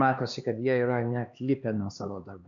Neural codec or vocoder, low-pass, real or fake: codec, 16 kHz, 2 kbps, X-Codec, HuBERT features, trained on LibriSpeech; 7.2 kHz; fake